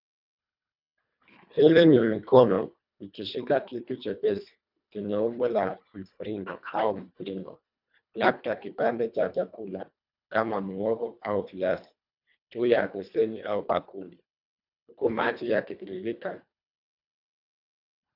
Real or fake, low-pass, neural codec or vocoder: fake; 5.4 kHz; codec, 24 kHz, 1.5 kbps, HILCodec